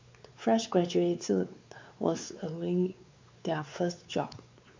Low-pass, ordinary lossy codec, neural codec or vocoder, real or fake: 7.2 kHz; MP3, 64 kbps; codec, 16 kHz, 4 kbps, X-Codec, WavLM features, trained on Multilingual LibriSpeech; fake